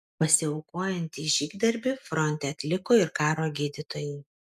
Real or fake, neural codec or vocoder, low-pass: real; none; 14.4 kHz